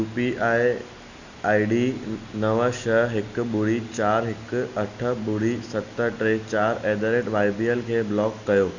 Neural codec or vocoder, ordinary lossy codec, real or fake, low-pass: none; none; real; 7.2 kHz